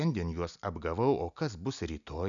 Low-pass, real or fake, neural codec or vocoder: 7.2 kHz; real; none